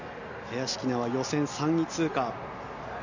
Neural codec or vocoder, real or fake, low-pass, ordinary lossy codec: none; real; 7.2 kHz; none